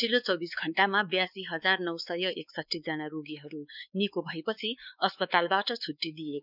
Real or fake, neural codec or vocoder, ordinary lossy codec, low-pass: fake; codec, 16 kHz, 4 kbps, X-Codec, WavLM features, trained on Multilingual LibriSpeech; none; 5.4 kHz